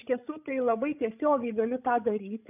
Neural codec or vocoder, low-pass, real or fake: codec, 16 kHz, 16 kbps, FreqCodec, larger model; 3.6 kHz; fake